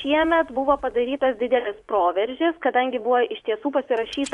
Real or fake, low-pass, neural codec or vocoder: fake; 10.8 kHz; vocoder, 24 kHz, 100 mel bands, Vocos